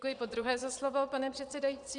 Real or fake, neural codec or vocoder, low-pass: fake; vocoder, 22.05 kHz, 80 mel bands, Vocos; 9.9 kHz